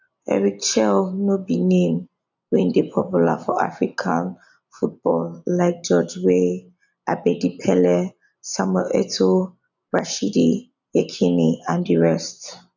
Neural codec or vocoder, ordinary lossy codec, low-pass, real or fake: none; none; 7.2 kHz; real